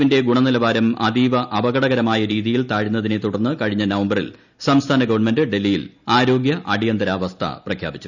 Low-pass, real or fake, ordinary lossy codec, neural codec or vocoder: 7.2 kHz; real; none; none